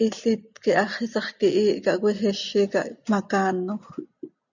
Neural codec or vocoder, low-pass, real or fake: none; 7.2 kHz; real